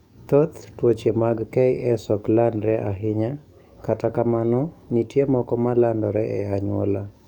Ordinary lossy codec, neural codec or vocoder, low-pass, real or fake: none; codec, 44.1 kHz, 7.8 kbps, DAC; 19.8 kHz; fake